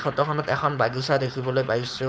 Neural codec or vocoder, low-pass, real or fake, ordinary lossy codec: codec, 16 kHz, 4.8 kbps, FACodec; none; fake; none